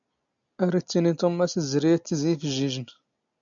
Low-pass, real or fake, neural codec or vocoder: 7.2 kHz; real; none